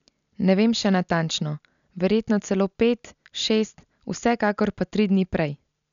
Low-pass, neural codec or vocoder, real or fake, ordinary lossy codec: 7.2 kHz; none; real; none